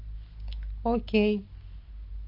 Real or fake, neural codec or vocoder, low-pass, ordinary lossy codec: real; none; 5.4 kHz; MP3, 48 kbps